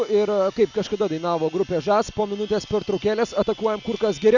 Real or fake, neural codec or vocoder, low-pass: real; none; 7.2 kHz